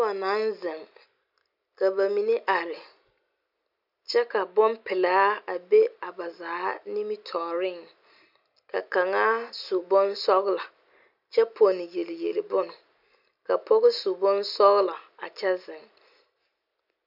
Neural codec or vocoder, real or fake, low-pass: none; real; 5.4 kHz